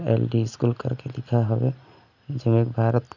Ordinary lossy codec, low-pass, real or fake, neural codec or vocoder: none; 7.2 kHz; real; none